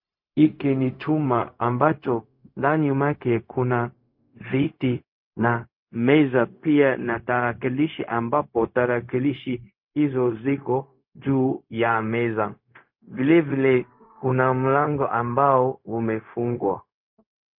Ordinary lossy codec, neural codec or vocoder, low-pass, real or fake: MP3, 32 kbps; codec, 16 kHz, 0.4 kbps, LongCat-Audio-Codec; 5.4 kHz; fake